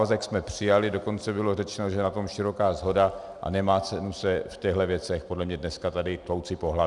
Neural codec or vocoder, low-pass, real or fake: none; 10.8 kHz; real